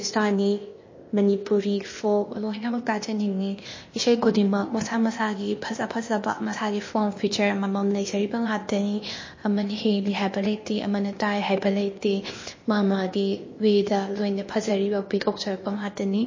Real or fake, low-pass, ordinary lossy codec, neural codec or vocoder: fake; 7.2 kHz; MP3, 32 kbps; codec, 16 kHz, 0.8 kbps, ZipCodec